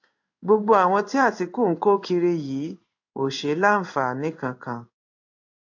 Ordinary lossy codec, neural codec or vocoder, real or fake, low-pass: AAC, 48 kbps; codec, 16 kHz in and 24 kHz out, 1 kbps, XY-Tokenizer; fake; 7.2 kHz